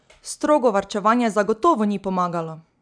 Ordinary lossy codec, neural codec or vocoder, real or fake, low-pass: none; none; real; 9.9 kHz